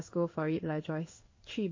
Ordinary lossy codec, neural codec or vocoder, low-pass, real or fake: MP3, 32 kbps; codec, 16 kHz in and 24 kHz out, 1 kbps, XY-Tokenizer; 7.2 kHz; fake